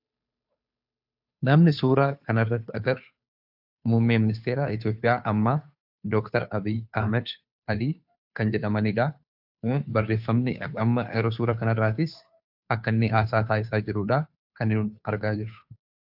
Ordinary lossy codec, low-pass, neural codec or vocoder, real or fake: AAC, 48 kbps; 5.4 kHz; codec, 16 kHz, 2 kbps, FunCodec, trained on Chinese and English, 25 frames a second; fake